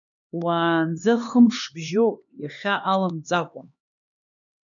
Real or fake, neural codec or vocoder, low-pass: fake; codec, 16 kHz, 2 kbps, X-Codec, HuBERT features, trained on LibriSpeech; 7.2 kHz